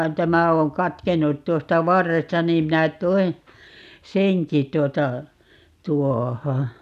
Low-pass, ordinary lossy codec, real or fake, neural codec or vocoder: 14.4 kHz; none; real; none